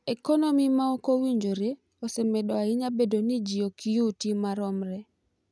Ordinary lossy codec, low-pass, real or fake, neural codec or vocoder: none; none; real; none